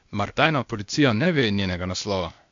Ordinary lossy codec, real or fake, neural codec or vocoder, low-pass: AAC, 64 kbps; fake; codec, 16 kHz, 0.8 kbps, ZipCodec; 7.2 kHz